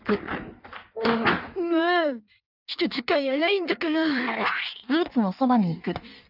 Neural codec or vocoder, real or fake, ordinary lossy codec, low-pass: codec, 16 kHz in and 24 kHz out, 1.1 kbps, FireRedTTS-2 codec; fake; none; 5.4 kHz